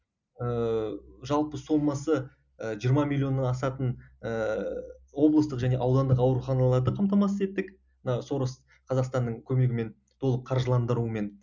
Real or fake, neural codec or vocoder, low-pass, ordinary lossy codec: real; none; 7.2 kHz; none